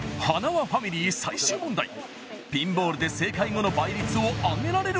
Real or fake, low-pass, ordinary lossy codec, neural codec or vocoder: real; none; none; none